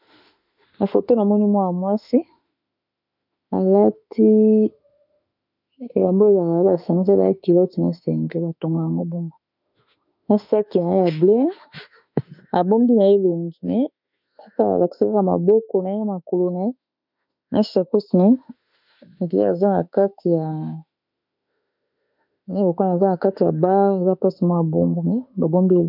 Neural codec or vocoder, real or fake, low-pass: autoencoder, 48 kHz, 32 numbers a frame, DAC-VAE, trained on Japanese speech; fake; 5.4 kHz